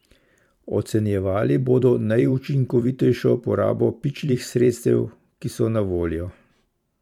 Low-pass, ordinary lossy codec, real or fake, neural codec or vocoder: 19.8 kHz; MP3, 96 kbps; fake; vocoder, 44.1 kHz, 128 mel bands every 512 samples, BigVGAN v2